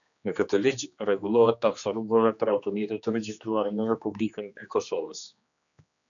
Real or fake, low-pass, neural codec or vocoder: fake; 7.2 kHz; codec, 16 kHz, 2 kbps, X-Codec, HuBERT features, trained on general audio